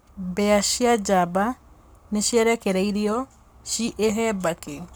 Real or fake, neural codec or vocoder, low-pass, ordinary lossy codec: fake; codec, 44.1 kHz, 7.8 kbps, Pupu-Codec; none; none